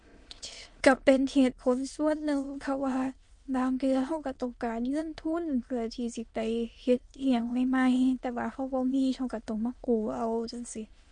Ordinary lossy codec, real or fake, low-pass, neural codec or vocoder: MP3, 48 kbps; fake; 9.9 kHz; autoencoder, 22.05 kHz, a latent of 192 numbers a frame, VITS, trained on many speakers